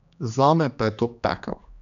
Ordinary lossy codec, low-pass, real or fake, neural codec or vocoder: none; 7.2 kHz; fake; codec, 16 kHz, 2 kbps, X-Codec, HuBERT features, trained on general audio